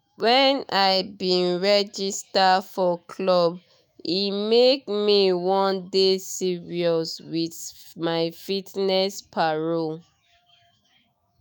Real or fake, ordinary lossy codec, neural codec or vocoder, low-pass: fake; none; autoencoder, 48 kHz, 128 numbers a frame, DAC-VAE, trained on Japanese speech; none